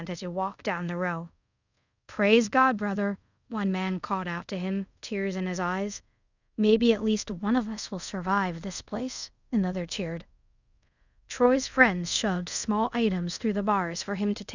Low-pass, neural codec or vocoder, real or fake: 7.2 kHz; codec, 24 kHz, 0.5 kbps, DualCodec; fake